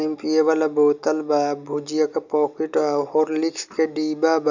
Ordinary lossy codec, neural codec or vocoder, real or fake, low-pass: none; none; real; 7.2 kHz